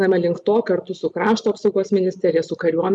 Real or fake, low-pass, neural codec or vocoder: real; 10.8 kHz; none